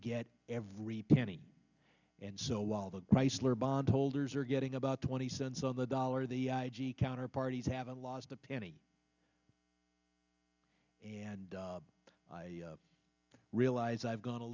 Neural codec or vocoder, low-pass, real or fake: none; 7.2 kHz; real